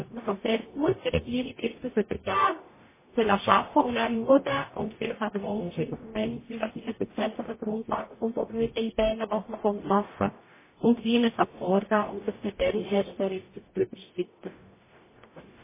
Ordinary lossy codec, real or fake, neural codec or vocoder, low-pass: MP3, 16 kbps; fake; codec, 44.1 kHz, 0.9 kbps, DAC; 3.6 kHz